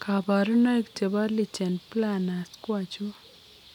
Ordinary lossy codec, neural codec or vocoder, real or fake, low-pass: none; none; real; 19.8 kHz